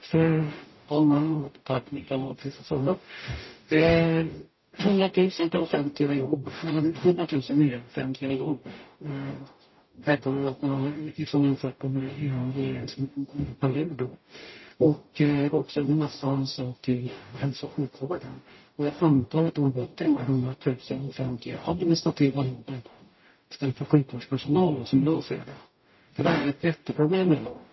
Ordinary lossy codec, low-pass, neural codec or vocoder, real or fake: MP3, 24 kbps; 7.2 kHz; codec, 44.1 kHz, 0.9 kbps, DAC; fake